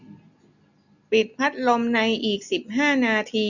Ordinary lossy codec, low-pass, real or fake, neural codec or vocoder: none; 7.2 kHz; real; none